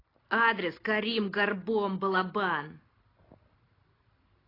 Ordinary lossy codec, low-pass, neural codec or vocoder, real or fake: AAC, 24 kbps; 5.4 kHz; none; real